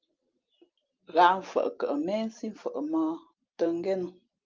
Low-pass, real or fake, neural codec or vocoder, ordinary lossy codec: 7.2 kHz; real; none; Opus, 24 kbps